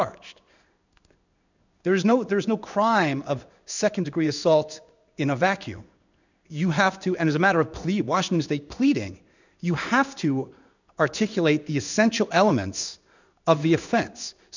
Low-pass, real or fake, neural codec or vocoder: 7.2 kHz; fake; codec, 16 kHz in and 24 kHz out, 1 kbps, XY-Tokenizer